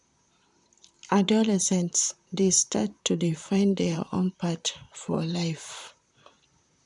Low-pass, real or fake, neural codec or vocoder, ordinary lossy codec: 10.8 kHz; fake; vocoder, 44.1 kHz, 128 mel bands, Pupu-Vocoder; none